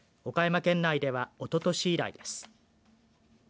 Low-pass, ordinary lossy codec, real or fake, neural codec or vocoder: none; none; real; none